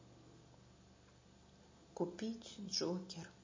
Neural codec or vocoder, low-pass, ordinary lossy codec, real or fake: none; 7.2 kHz; MP3, 32 kbps; real